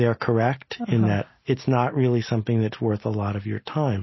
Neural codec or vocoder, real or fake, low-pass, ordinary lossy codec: none; real; 7.2 kHz; MP3, 24 kbps